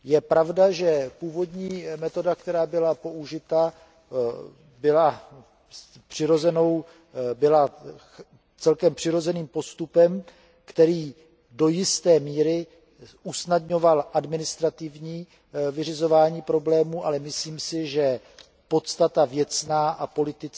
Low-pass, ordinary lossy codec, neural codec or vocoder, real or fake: none; none; none; real